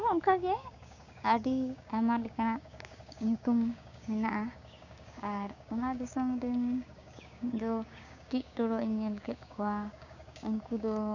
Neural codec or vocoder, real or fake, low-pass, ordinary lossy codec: codec, 16 kHz, 6 kbps, DAC; fake; 7.2 kHz; none